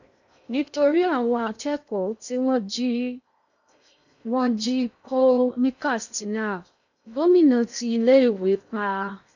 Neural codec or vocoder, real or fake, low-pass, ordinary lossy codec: codec, 16 kHz in and 24 kHz out, 0.6 kbps, FocalCodec, streaming, 2048 codes; fake; 7.2 kHz; none